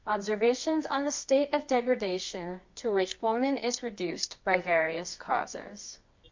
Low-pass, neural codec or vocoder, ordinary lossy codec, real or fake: 7.2 kHz; codec, 24 kHz, 0.9 kbps, WavTokenizer, medium music audio release; MP3, 48 kbps; fake